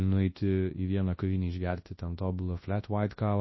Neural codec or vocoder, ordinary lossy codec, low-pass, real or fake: codec, 24 kHz, 0.9 kbps, WavTokenizer, large speech release; MP3, 24 kbps; 7.2 kHz; fake